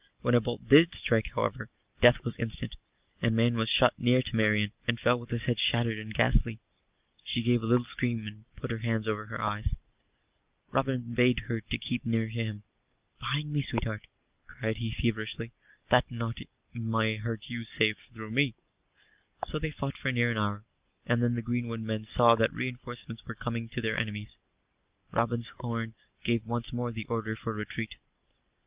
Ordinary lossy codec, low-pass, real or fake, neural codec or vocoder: Opus, 24 kbps; 3.6 kHz; real; none